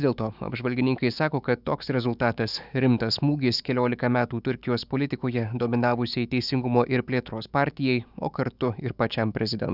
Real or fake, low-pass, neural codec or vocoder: fake; 5.4 kHz; autoencoder, 48 kHz, 128 numbers a frame, DAC-VAE, trained on Japanese speech